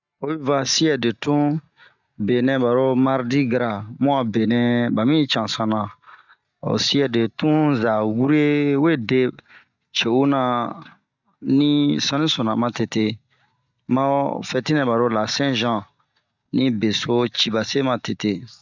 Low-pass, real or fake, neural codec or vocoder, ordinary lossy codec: 7.2 kHz; real; none; none